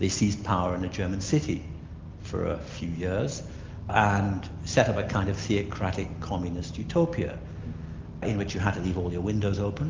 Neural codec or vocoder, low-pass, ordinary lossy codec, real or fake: none; 7.2 kHz; Opus, 32 kbps; real